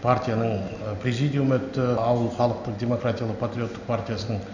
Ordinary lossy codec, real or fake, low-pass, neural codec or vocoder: none; real; 7.2 kHz; none